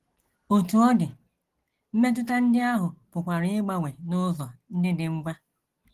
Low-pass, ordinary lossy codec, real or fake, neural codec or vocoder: 14.4 kHz; Opus, 16 kbps; real; none